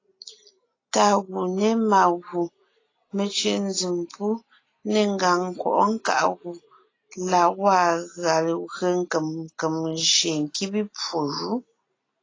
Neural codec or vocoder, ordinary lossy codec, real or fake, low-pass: none; AAC, 32 kbps; real; 7.2 kHz